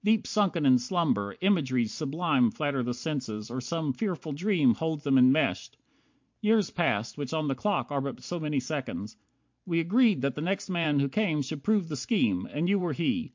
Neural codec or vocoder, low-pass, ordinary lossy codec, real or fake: vocoder, 44.1 kHz, 128 mel bands every 512 samples, BigVGAN v2; 7.2 kHz; MP3, 48 kbps; fake